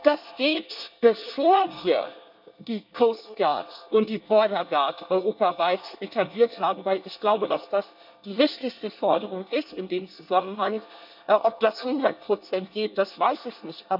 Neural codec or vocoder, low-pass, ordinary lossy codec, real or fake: codec, 24 kHz, 1 kbps, SNAC; 5.4 kHz; none; fake